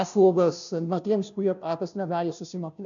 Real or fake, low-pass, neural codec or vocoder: fake; 7.2 kHz; codec, 16 kHz, 0.5 kbps, FunCodec, trained on Chinese and English, 25 frames a second